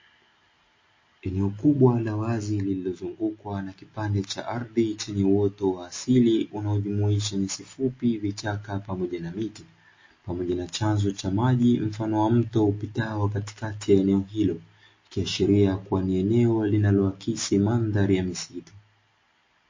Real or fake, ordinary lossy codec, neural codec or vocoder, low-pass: real; MP3, 32 kbps; none; 7.2 kHz